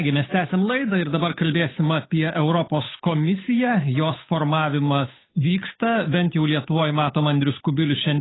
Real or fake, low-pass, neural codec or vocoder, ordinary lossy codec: fake; 7.2 kHz; codec, 16 kHz, 8 kbps, FunCodec, trained on Chinese and English, 25 frames a second; AAC, 16 kbps